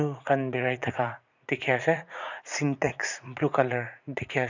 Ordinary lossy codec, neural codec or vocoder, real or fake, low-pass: none; vocoder, 44.1 kHz, 80 mel bands, Vocos; fake; 7.2 kHz